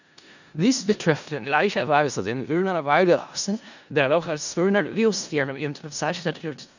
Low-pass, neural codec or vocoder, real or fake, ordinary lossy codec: 7.2 kHz; codec, 16 kHz in and 24 kHz out, 0.4 kbps, LongCat-Audio-Codec, four codebook decoder; fake; none